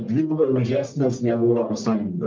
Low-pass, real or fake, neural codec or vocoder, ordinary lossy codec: 7.2 kHz; fake; codec, 44.1 kHz, 1.7 kbps, Pupu-Codec; Opus, 24 kbps